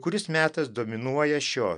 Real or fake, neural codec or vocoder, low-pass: real; none; 9.9 kHz